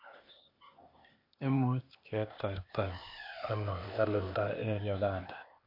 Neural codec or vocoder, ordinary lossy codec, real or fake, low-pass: codec, 16 kHz, 0.8 kbps, ZipCodec; MP3, 32 kbps; fake; 5.4 kHz